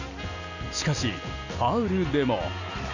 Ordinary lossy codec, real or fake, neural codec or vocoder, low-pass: none; real; none; 7.2 kHz